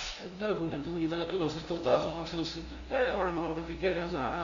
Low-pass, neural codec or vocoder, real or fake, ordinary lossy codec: 7.2 kHz; codec, 16 kHz, 0.5 kbps, FunCodec, trained on LibriTTS, 25 frames a second; fake; Opus, 64 kbps